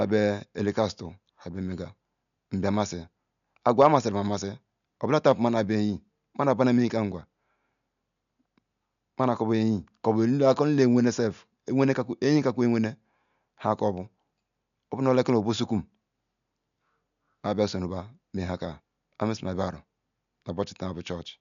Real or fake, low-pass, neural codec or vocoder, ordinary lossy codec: real; 7.2 kHz; none; none